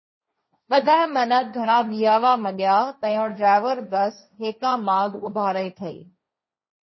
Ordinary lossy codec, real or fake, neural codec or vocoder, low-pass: MP3, 24 kbps; fake; codec, 16 kHz, 1.1 kbps, Voila-Tokenizer; 7.2 kHz